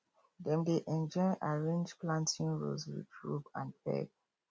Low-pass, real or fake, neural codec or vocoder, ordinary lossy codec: none; real; none; none